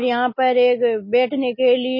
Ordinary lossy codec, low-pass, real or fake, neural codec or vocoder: MP3, 24 kbps; 5.4 kHz; real; none